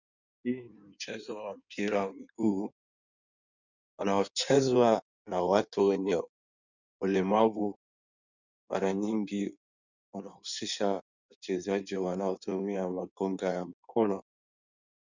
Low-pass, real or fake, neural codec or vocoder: 7.2 kHz; fake; codec, 16 kHz in and 24 kHz out, 1.1 kbps, FireRedTTS-2 codec